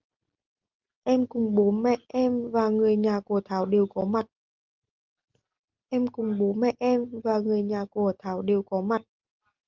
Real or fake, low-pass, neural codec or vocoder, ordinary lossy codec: real; 7.2 kHz; none; Opus, 24 kbps